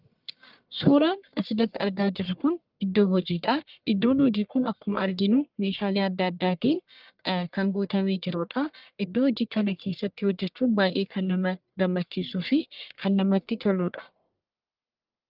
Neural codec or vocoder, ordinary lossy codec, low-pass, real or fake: codec, 44.1 kHz, 1.7 kbps, Pupu-Codec; Opus, 24 kbps; 5.4 kHz; fake